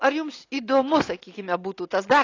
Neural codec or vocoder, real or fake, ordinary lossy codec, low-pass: none; real; AAC, 32 kbps; 7.2 kHz